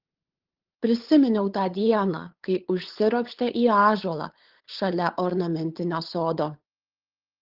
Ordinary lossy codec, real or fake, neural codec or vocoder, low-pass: Opus, 16 kbps; fake; codec, 16 kHz, 8 kbps, FunCodec, trained on LibriTTS, 25 frames a second; 5.4 kHz